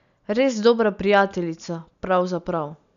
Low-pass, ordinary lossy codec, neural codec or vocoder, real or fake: 7.2 kHz; none; none; real